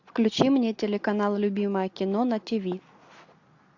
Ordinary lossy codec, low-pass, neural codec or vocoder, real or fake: AAC, 48 kbps; 7.2 kHz; none; real